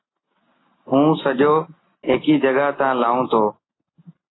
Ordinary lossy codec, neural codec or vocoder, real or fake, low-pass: AAC, 16 kbps; none; real; 7.2 kHz